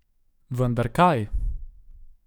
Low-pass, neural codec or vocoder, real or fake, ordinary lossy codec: 19.8 kHz; codec, 44.1 kHz, 7.8 kbps, DAC; fake; none